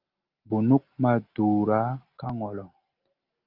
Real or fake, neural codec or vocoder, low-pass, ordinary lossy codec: real; none; 5.4 kHz; Opus, 24 kbps